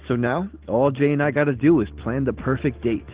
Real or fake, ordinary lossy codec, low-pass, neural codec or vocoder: real; Opus, 16 kbps; 3.6 kHz; none